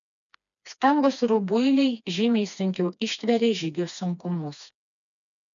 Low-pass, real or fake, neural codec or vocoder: 7.2 kHz; fake; codec, 16 kHz, 2 kbps, FreqCodec, smaller model